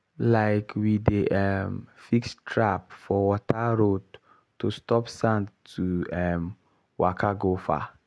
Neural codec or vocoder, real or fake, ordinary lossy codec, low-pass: none; real; none; none